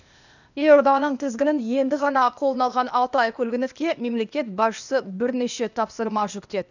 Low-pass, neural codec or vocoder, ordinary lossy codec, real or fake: 7.2 kHz; codec, 16 kHz, 0.8 kbps, ZipCodec; none; fake